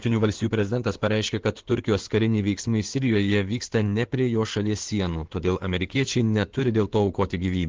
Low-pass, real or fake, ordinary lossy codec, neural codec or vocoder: 7.2 kHz; fake; Opus, 16 kbps; codec, 16 kHz in and 24 kHz out, 2.2 kbps, FireRedTTS-2 codec